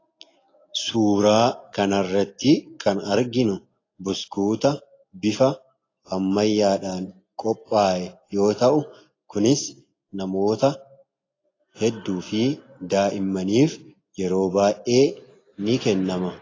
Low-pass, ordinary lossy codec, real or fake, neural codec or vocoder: 7.2 kHz; AAC, 32 kbps; real; none